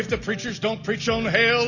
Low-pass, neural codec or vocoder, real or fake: 7.2 kHz; none; real